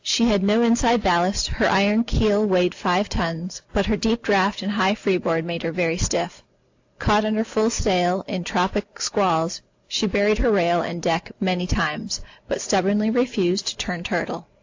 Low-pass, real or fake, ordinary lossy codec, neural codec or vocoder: 7.2 kHz; real; AAC, 48 kbps; none